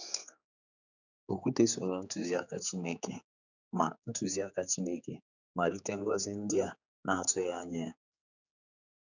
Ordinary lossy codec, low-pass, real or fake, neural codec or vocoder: none; 7.2 kHz; fake; codec, 16 kHz, 4 kbps, X-Codec, HuBERT features, trained on general audio